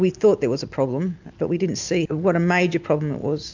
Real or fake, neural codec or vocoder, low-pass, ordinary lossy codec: real; none; 7.2 kHz; MP3, 64 kbps